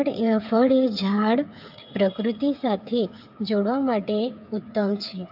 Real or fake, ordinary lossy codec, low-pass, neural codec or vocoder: fake; AAC, 48 kbps; 5.4 kHz; codec, 16 kHz, 8 kbps, FreqCodec, smaller model